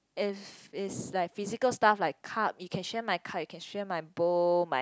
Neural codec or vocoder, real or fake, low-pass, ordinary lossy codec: none; real; none; none